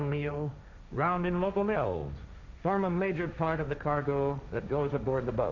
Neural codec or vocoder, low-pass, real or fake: codec, 16 kHz, 1.1 kbps, Voila-Tokenizer; 7.2 kHz; fake